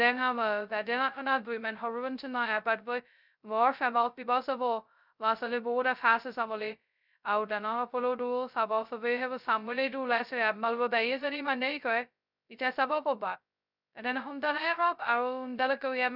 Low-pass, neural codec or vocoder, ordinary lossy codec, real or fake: 5.4 kHz; codec, 16 kHz, 0.2 kbps, FocalCodec; none; fake